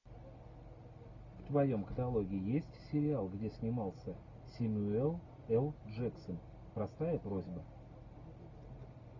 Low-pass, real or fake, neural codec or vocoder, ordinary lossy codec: 7.2 kHz; real; none; MP3, 64 kbps